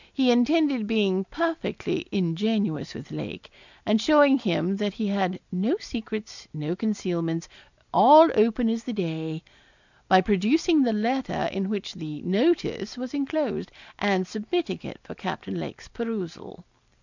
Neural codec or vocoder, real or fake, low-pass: none; real; 7.2 kHz